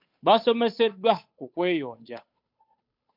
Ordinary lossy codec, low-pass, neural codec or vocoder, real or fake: MP3, 48 kbps; 5.4 kHz; codec, 16 kHz, 8 kbps, FunCodec, trained on Chinese and English, 25 frames a second; fake